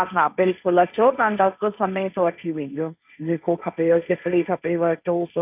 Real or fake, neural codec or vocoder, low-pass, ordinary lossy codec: fake; codec, 16 kHz, 1.1 kbps, Voila-Tokenizer; 3.6 kHz; none